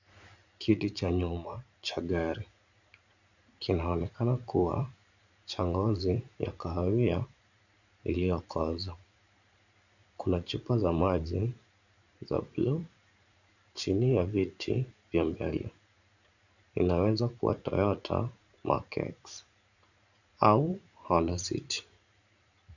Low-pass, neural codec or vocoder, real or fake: 7.2 kHz; vocoder, 44.1 kHz, 80 mel bands, Vocos; fake